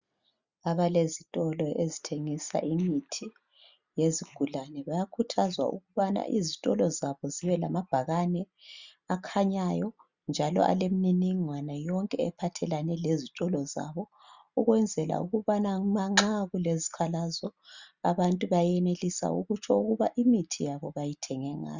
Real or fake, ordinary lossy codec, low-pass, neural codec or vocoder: real; Opus, 64 kbps; 7.2 kHz; none